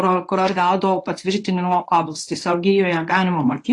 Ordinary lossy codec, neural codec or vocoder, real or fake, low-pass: AAC, 48 kbps; codec, 24 kHz, 0.9 kbps, WavTokenizer, medium speech release version 1; fake; 10.8 kHz